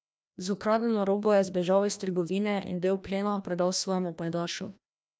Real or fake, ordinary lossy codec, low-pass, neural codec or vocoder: fake; none; none; codec, 16 kHz, 1 kbps, FreqCodec, larger model